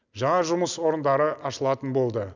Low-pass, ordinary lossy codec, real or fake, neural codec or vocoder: 7.2 kHz; none; real; none